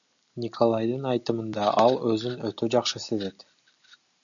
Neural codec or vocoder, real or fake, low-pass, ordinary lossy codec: none; real; 7.2 kHz; MP3, 96 kbps